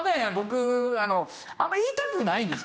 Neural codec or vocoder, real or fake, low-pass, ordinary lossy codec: codec, 16 kHz, 1 kbps, X-Codec, HuBERT features, trained on general audio; fake; none; none